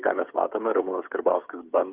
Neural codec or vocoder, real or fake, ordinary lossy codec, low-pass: codec, 16 kHz, 8 kbps, FreqCodec, smaller model; fake; Opus, 32 kbps; 3.6 kHz